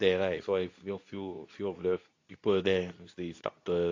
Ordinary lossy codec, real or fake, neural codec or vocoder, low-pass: AAC, 48 kbps; fake; codec, 24 kHz, 0.9 kbps, WavTokenizer, medium speech release version 2; 7.2 kHz